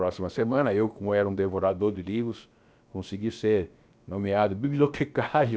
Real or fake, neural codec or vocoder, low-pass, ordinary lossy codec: fake; codec, 16 kHz, about 1 kbps, DyCAST, with the encoder's durations; none; none